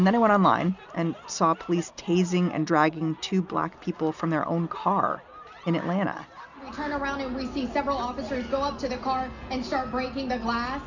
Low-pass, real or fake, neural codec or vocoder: 7.2 kHz; real; none